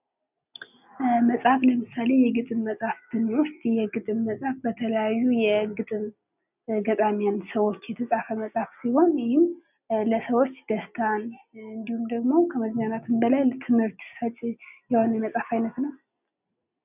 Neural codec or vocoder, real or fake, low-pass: none; real; 3.6 kHz